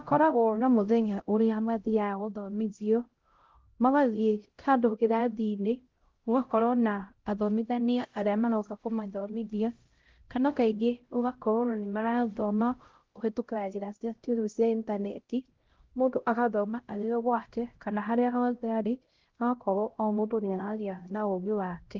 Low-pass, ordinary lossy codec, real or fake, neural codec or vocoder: 7.2 kHz; Opus, 16 kbps; fake; codec, 16 kHz, 0.5 kbps, X-Codec, HuBERT features, trained on LibriSpeech